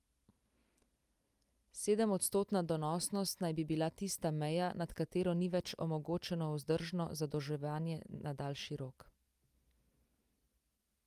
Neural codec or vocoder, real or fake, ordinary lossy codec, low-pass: none; real; Opus, 32 kbps; 14.4 kHz